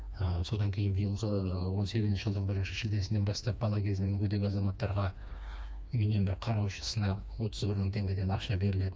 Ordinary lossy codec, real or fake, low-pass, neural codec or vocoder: none; fake; none; codec, 16 kHz, 2 kbps, FreqCodec, smaller model